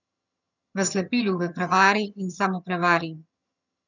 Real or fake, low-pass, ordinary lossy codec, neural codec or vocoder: fake; 7.2 kHz; none; vocoder, 22.05 kHz, 80 mel bands, HiFi-GAN